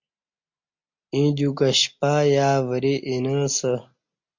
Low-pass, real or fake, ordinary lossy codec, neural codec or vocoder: 7.2 kHz; real; MP3, 48 kbps; none